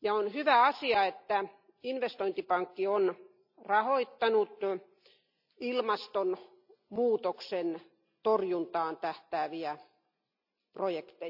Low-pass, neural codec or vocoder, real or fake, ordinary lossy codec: 5.4 kHz; none; real; none